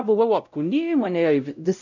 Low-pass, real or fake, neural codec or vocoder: 7.2 kHz; fake; codec, 16 kHz, 0.5 kbps, X-Codec, WavLM features, trained on Multilingual LibriSpeech